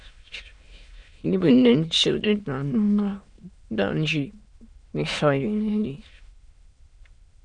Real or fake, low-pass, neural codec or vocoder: fake; 9.9 kHz; autoencoder, 22.05 kHz, a latent of 192 numbers a frame, VITS, trained on many speakers